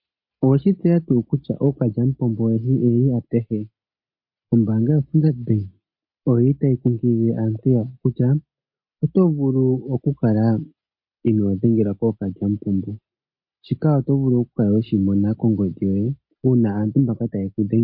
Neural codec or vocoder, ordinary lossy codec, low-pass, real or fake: none; MP3, 32 kbps; 5.4 kHz; real